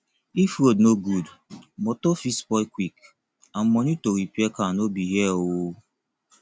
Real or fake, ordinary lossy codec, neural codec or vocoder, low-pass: real; none; none; none